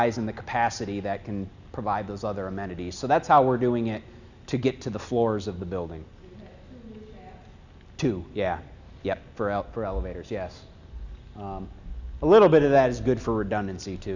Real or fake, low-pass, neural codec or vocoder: real; 7.2 kHz; none